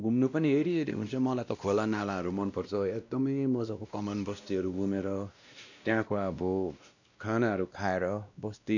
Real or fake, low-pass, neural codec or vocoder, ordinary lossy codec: fake; 7.2 kHz; codec, 16 kHz, 1 kbps, X-Codec, WavLM features, trained on Multilingual LibriSpeech; none